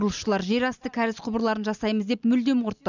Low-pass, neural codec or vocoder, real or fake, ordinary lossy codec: 7.2 kHz; none; real; none